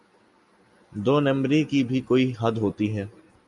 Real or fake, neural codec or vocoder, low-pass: real; none; 10.8 kHz